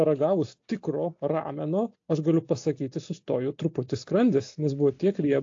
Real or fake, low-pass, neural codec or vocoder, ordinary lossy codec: real; 7.2 kHz; none; AAC, 48 kbps